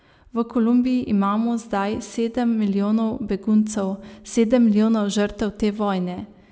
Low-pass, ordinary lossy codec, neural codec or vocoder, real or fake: none; none; none; real